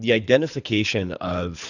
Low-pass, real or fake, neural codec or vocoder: 7.2 kHz; fake; codec, 24 kHz, 3 kbps, HILCodec